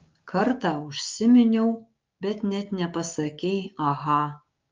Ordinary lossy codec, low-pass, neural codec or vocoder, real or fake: Opus, 32 kbps; 7.2 kHz; codec, 16 kHz, 6 kbps, DAC; fake